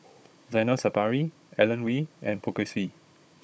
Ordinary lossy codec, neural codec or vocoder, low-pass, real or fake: none; codec, 16 kHz, 16 kbps, FunCodec, trained on Chinese and English, 50 frames a second; none; fake